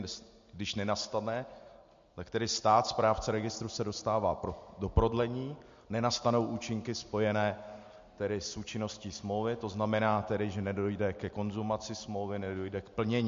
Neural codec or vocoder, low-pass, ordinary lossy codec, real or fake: none; 7.2 kHz; MP3, 48 kbps; real